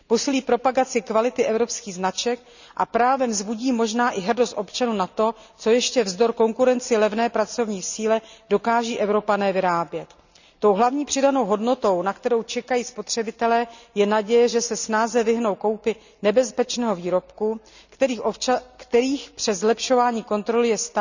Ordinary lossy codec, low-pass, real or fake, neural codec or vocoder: none; 7.2 kHz; real; none